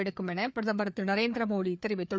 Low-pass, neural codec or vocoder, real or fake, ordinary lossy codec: none; codec, 16 kHz, 4 kbps, FreqCodec, larger model; fake; none